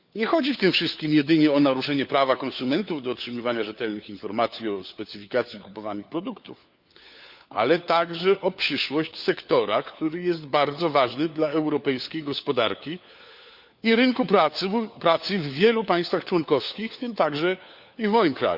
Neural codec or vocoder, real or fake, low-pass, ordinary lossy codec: codec, 16 kHz, 4 kbps, FunCodec, trained on LibriTTS, 50 frames a second; fake; 5.4 kHz; Opus, 64 kbps